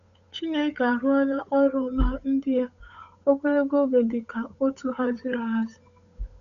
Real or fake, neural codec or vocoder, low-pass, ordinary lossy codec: fake; codec, 16 kHz, 8 kbps, FunCodec, trained on Chinese and English, 25 frames a second; 7.2 kHz; none